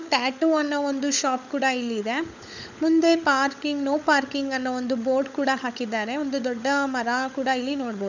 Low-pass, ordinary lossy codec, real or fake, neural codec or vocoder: 7.2 kHz; none; fake; codec, 16 kHz, 16 kbps, FunCodec, trained on LibriTTS, 50 frames a second